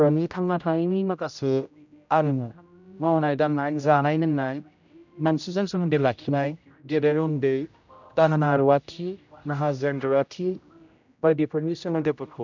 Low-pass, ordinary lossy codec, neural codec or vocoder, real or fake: 7.2 kHz; none; codec, 16 kHz, 0.5 kbps, X-Codec, HuBERT features, trained on general audio; fake